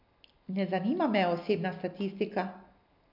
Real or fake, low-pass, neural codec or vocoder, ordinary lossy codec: real; 5.4 kHz; none; MP3, 48 kbps